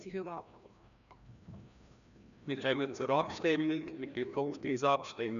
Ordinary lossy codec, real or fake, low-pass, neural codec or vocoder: none; fake; 7.2 kHz; codec, 16 kHz, 1 kbps, FreqCodec, larger model